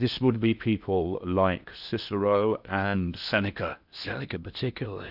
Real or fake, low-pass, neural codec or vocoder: fake; 5.4 kHz; codec, 16 kHz in and 24 kHz out, 0.8 kbps, FocalCodec, streaming, 65536 codes